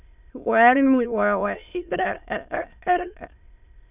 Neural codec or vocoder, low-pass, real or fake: autoencoder, 22.05 kHz, a latent of 192 numbers a frame, VITS, trained on many speakers; 3.6 kHz; fake